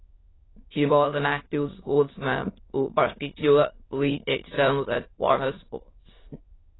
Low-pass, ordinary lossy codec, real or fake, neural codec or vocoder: 7.2 kHz; AAC, 16 kbps; fake; autoencoder, 22.05 kHz, a latent of 192 numbers a frame, VITS, trained on many speakers